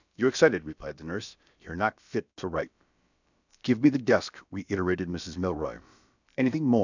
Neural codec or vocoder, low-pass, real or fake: codec, 16 kHz, about 1 kbps, DyCAST, with the encoder's durations; 7.2 kHz; fake